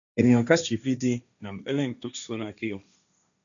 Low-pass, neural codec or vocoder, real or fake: 7.2 kHz; codec, 16 kHz, 1.1 kbps, Voila-Tokenizer; fake